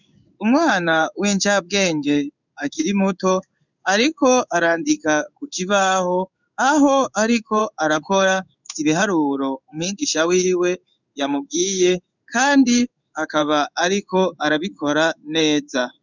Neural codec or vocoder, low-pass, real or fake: codec, 16 kHz in and 24 kHz out, 1 kbps, XY-Tokenizer; 7.2 kHz; fake